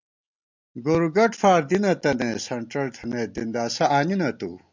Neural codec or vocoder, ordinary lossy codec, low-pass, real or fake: none; MP3, 64 kbps; 7.2 kHz; real